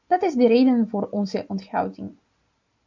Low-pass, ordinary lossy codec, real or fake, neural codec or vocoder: 7.2 kHz; MP3, 64 kbps; real; none